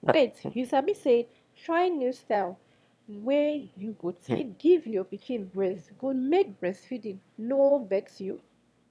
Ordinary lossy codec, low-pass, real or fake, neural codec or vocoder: none; none; fake; autoencoder, 22.05 kHz, a latent of 192 numbers a frame, VITS, trained on one speaker